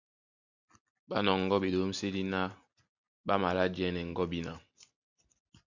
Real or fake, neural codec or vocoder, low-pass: real; none; 7.2 kHz